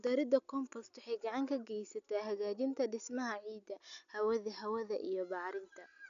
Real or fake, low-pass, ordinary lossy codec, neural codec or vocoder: real; 7.2 kHz; none; none